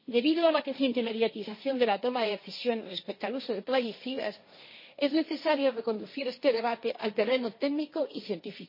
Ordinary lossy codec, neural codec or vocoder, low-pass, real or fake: MP3, 24 kbps; codec, 16 kHz, 1.1 kbps, Voila-Tokenizer; 5.4 kHz; fake